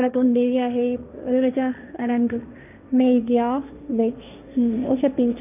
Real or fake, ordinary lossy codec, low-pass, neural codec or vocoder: fake; none; 3.6 kHz; codec, 16 kHz, 1.1 kbps, Voila-Tokenizer